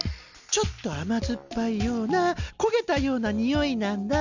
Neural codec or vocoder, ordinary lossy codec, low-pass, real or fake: none; none; 7.2 kHz; real